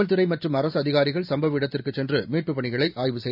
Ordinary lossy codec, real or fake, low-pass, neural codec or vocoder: none; real; 5.4 kHz; none